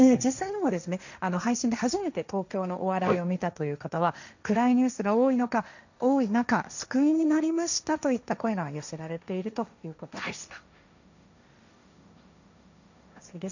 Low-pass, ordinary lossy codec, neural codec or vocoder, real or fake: 7.2 kHz; none; codec, 16 kHz, 1.1 kbps, Voila-Tokenizer; fake